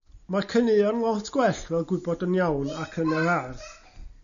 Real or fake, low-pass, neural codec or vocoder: real; 7.2 kHz; none